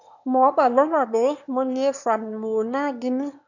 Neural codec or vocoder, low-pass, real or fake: autoencoder, 22.05 kHz, a latent of 192 numbers a frame, VITS, trained on one speaker; 7.2 kHz; fake